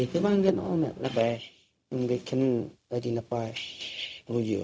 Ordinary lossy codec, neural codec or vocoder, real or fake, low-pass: none; codec, 16 kHz, 0.4 kbps, LongCat-Audio-Codec; fake; none